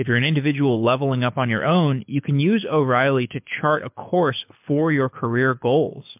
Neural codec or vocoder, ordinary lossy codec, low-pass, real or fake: codec, 24 kHz, 6 kbps, HILCodec; MP3, 32 kbps; 3.6 kHz; fake